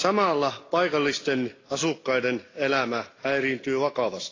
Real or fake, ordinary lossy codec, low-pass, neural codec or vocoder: real; AAC, 32 kbps; 7.2 kHz; none